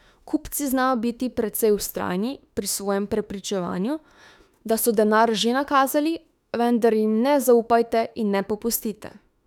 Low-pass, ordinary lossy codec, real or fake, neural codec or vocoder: 19.8 kHz; none; fake; autoencoder, 48 kHz, 32 numbers a frame, DAC-VAE, trained on Japanese speech